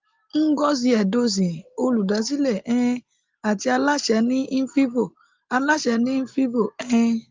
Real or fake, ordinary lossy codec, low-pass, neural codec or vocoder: real; Opus, 32 kbps; 7.2 kHz; none